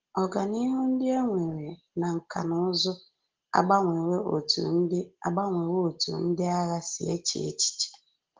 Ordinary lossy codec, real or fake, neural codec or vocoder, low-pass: Opus, 16 kbps; real; none; 7.2 kHz